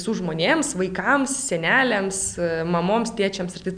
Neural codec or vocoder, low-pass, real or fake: none; 9.9 kHz; real